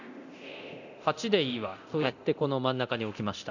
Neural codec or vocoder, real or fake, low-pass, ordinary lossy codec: codec, 24 kHz, 0.9 kbps, DualCodec; fake; 7.2 kHz; none